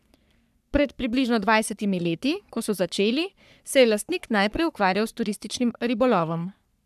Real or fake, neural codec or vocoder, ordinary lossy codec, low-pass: fake; codec, 44.1 kHz, 3.4 kbps, Pupu-Codec; none; 14.4 kHz